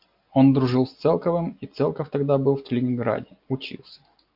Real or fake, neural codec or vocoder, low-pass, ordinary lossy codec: real; none; 5.4 kHz; AAC, 48 kbps